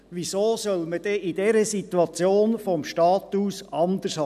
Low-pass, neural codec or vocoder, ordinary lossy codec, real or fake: 14.4 kHz; none; none; real